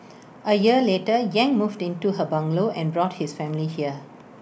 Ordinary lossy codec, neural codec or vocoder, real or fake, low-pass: none; none; real; none